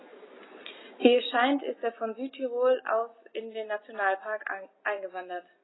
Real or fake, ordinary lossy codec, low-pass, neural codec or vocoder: real; AAC, 16 kbps; 7.2 kHz; none